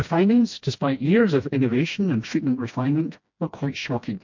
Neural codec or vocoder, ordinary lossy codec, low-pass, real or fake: codec, 16 kHz, 1 kbps, FreqCodec, smaller model; MP3, 48 kbps; 7.2 kHz; fake